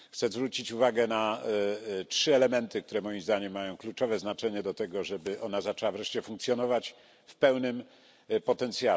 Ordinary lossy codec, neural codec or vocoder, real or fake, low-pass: none; none; real; none